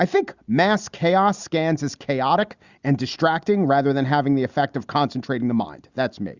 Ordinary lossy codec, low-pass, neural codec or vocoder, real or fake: Opus, 64 kbps; 7.2 kHz; none; real